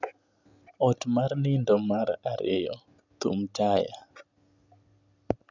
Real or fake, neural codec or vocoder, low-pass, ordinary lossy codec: real; none; 7.2 kHz; none